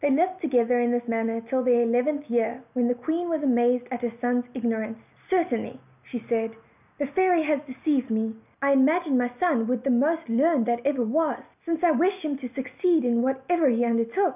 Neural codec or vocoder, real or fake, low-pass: none; real; 3.6 kHz